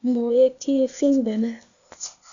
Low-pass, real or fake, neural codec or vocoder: 7.2 kHz; fake; codec, 16 kHz, 0.8 kbps, ZipCodec